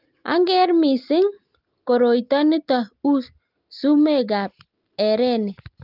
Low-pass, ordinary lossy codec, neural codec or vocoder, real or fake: 5.4 kHz; Opus, 32 kbps; none; real